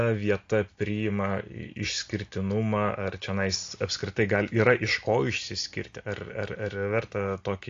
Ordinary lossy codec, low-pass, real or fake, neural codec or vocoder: AAC, 48 kbps; 7.2 kHz; real; none